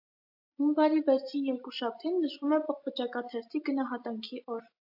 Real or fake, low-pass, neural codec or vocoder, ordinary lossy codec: real; 5.4 kHz; none; AAC, 48 kbps